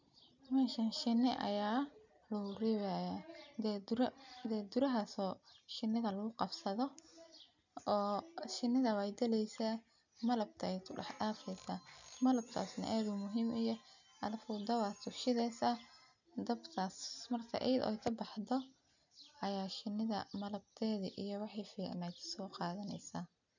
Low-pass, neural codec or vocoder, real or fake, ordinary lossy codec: 7.2 kHz; none; real; none